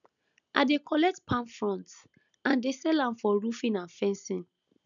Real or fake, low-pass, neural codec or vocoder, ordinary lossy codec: real; 7.2 kHz; none; none